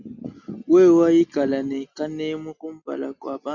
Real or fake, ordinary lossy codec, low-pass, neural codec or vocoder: real; MP3, 64 kbps; 7.2 kHz; none